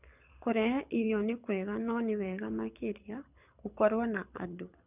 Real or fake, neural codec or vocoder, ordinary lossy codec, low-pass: fake; codec, 24 kHz, 6 kbps, HILCodec; none; 3.6 kHz